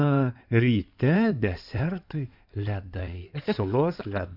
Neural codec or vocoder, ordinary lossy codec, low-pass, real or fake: codec, 16 kHz in and 24 kHz out, 2.2 kbps, FireRedTTS-2 codec; MP3, 48 kbps; 5.4 kHz; fake